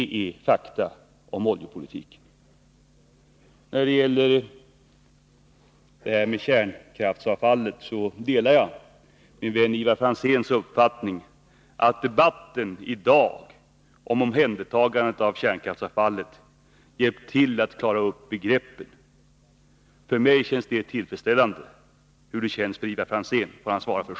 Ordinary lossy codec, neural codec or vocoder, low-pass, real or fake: none; none; none; real